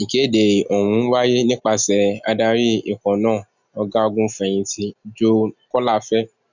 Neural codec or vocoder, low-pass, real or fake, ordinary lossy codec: none; 7.2 kHz; real; none